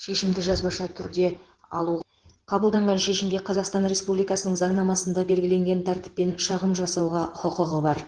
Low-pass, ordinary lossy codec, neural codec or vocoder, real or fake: 9.9 kHz; Opus, 16 kbps; codec, 16 kHz in and 24 kHz out, 2.2 kbps, FireRedTTS-2 codec; fake